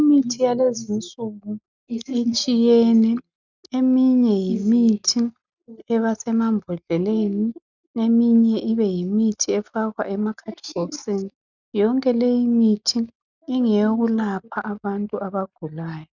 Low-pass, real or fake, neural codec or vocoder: 7.2 kHz; real; none